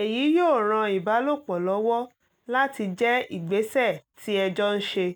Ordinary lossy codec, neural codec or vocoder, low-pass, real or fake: none; none; none; real